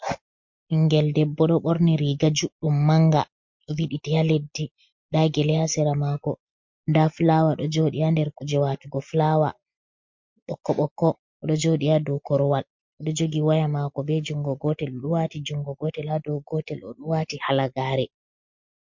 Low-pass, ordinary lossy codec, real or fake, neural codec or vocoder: 7.2 kHz; MP3, 48 kbps; real; none